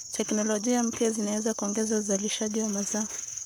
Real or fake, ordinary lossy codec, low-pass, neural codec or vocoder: fake; none; none; codec, 44.1 kHz, 7.8 kbps, Pupu-Codec